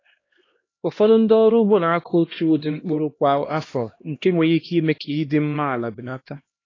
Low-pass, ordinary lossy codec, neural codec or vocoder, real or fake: 7.2 kHz; AAC, 32 kbps; codec, 16 kHz, 1 kbps, X-Codec, HuBERT features, trained on LibriSpeech; fake